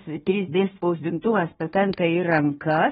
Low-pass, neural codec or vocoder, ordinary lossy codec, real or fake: 7.2 kHz; codec, 16 kHz, 1 kbps, FunCodec, trained on Chinese and English, 50 frames a second; AAC, 16 kbps; fake